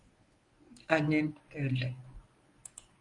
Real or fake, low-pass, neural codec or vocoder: fake; 10.8 kHz; codec, 24 kHz, 0.9 kbps, WavTokenizer, medium speech release version 1